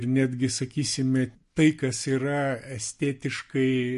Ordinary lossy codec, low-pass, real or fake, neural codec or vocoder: MP3, 48 kbps; 14.4 kHz; real; none